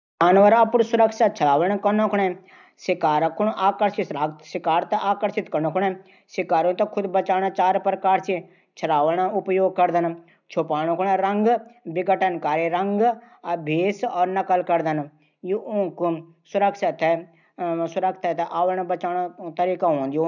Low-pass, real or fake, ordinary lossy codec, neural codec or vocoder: 7.2 kHz; real; none; none